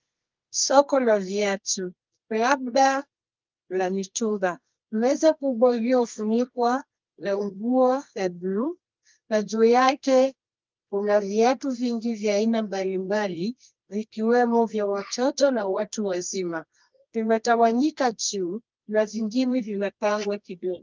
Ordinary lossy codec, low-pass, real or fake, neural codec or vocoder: Opus, 24 kbps; 7.2 kHz; fake; codec, 24 kHz, 0.9 kbps, WavTokenizer, medium music audio release